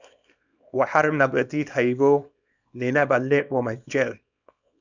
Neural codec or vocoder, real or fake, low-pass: codec, 24 kHz, 0.9 kbps, WavTokenizer, small release; fake; 7.2 kHz